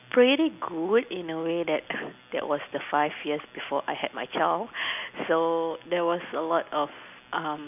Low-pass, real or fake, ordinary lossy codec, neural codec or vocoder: 3.6 kHz; real; none; none